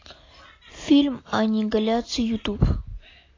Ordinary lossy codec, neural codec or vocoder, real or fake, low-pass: AAC, 32 kbps; none; real; 7.2 kHz